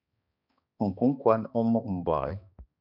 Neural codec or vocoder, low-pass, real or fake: codec, 16 kHz, 2 kbps, X-Codec, HuBERT features, trained on balanced general audio; 5.4 kHz; fake